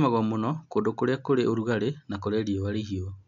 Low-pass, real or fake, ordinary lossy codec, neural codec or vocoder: 7.2 kHz; real; MP3, 64 kbps; none